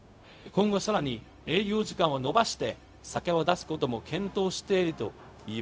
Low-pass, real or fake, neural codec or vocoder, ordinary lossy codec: none; fake; codec, 16 kHz, 0.4 kbps, LongCat-Audio-Codec; none